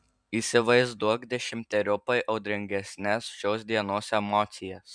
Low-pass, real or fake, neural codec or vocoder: 9.9 kHz; fake; vocoder, 44.1 kHz, 128 mel bands every 512 samples, BigVGAN v2